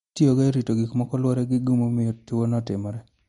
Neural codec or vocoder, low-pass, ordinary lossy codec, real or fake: none; 19.8 kHz; MP3, 48 kbps; real